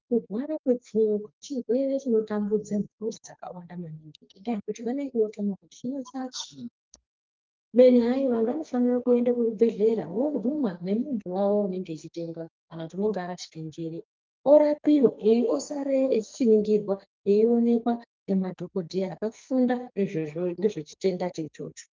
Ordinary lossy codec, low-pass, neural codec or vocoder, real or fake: Opus, 24 kbps; 7.2 kHz; codec, 44.1 kHz, 2.6 kbps, SNAC; fake